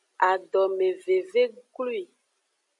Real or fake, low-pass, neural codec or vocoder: real; 10.8 kHz; none